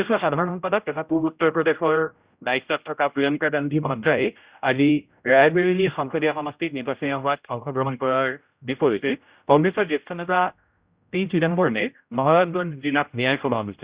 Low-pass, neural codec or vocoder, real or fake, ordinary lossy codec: 3.6 kHz; codec, 16 kHz, 0.5 kbps, X-Codec, HuBERT features, trained on general audio; fake; Opus, 64 kbps